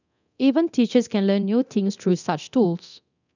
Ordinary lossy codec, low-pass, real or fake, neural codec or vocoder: none; 7.2 kHz; fake; codec, 24 kHz, 0.9 kbps, DualCodec